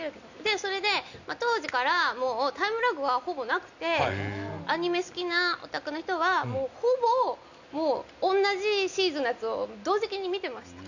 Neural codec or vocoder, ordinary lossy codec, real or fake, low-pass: none; none; real; 7.2 kHz